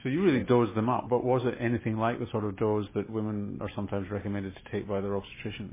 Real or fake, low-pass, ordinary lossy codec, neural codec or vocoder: fake; 3.6 kHz; MP3, 16 kbps; autoencoder, 48 kHz, 128 numbers a frame, DAC-VAE, trained on Japanese speech